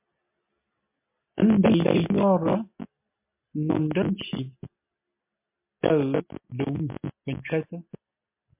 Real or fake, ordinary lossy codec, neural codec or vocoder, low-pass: real; MP3, 32 kbps; none; 3.6 kHz